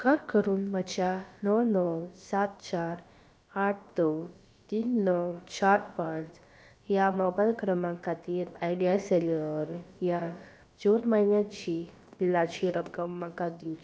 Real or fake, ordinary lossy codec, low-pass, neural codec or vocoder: fake; none; none; codec, 16 kHz, about 1 kbps, DyCAST, with the encoder's durations